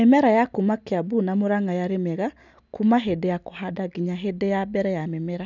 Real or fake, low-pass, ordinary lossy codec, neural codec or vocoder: real; 7.2 kHz; none; none